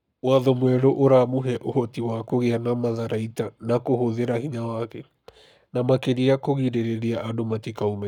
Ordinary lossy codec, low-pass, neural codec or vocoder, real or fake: none; 19.8 kHz; codec, 44.1 kHz, 7.8 kbps, Pupu-Codec; fake